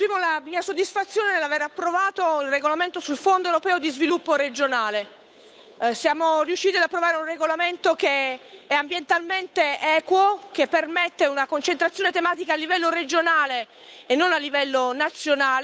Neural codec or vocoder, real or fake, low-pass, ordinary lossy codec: codec, 16 kHz, 8 kbps, FunCodec, trained on Chinese and English, 25 frames a second; fake; none; none